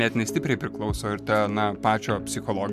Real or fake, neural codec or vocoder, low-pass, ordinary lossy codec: real; none; 14.4 kHz; MP3, 96 kbps